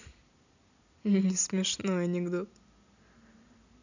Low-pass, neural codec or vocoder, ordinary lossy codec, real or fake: 7.2 kHz; none; none; real